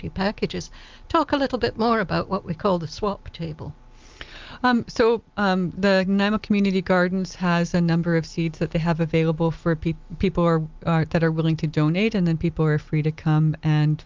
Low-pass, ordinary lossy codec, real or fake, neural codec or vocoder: 7.2 kHz; Opus, 32 kbps; real; none